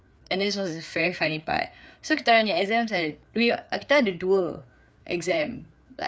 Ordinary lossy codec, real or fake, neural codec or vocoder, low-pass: none; fake; codec, 16 kHz, 4 kbps, FreqCodec, larger model; none